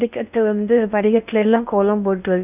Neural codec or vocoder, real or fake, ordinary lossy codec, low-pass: codec, 16 kHz in and 24 kHz out, 0.6 kbps, FocalCodec, streaming, 4096 codes; fake; none; 3.6 kHz